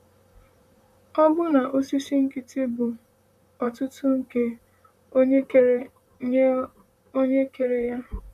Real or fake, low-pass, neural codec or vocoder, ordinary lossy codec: fake; 14.4 kHz; vocoder, 44.1 kHz, 128 mel bands, Pupu-Vocoder; none